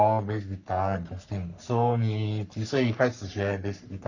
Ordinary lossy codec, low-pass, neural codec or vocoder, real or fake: none; 7.2 kHz; codec, 44.1 kHz, 3.4 kbps, Pupu-Codec; fake